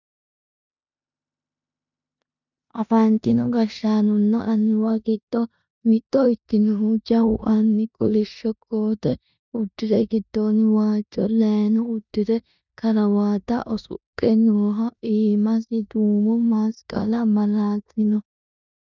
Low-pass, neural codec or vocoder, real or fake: 7.2 kHz; codec, 16 kHz in and 24 kHz out, 0.9 kbps, LongCat-Audio-Codec, four codebook decoder; fake